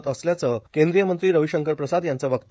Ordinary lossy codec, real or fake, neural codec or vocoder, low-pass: none; fake; codec, 16 kHz, 16 kbps, FreqCodec, smaller model; none